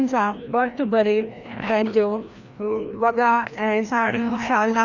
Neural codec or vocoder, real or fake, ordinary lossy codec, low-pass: codec, 16 kHz, 1 kbps, FreqCodec, larger model; fake; none; 7.2 kHz